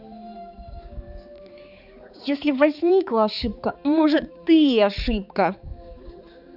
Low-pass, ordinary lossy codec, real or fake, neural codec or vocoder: 5.4 kHz; none; fake; codec, 16 kHz, 4 kbps, X-Codec, HuBERT features, trained on balanced general audio